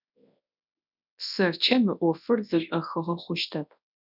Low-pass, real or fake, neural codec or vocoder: 5.4 kHz; fake; codec, 24 kHz, 0.9 kbps, WavTokenizer, large speech release